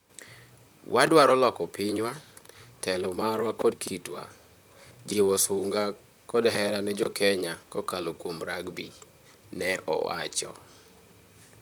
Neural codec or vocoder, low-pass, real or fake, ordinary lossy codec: vocoder, 44.1 kHz, 128 mel bands, Pupu-Vocoder; none; fake; none